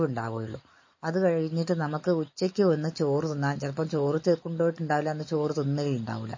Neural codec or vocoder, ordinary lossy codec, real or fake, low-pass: none; MP3, 32 kbps; real; 7.2 kHz